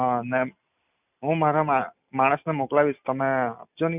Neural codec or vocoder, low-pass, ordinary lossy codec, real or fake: none; 3.6 kHz; none; real